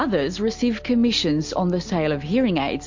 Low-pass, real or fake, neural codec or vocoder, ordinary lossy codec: 7.2 kHz; real; none; MP3, 48 kbps